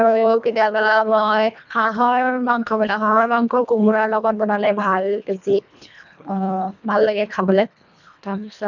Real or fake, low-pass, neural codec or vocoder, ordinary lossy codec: fake; 7.2 kHz; codec, 24 kHz, 1.5 kbps, HILCodec; none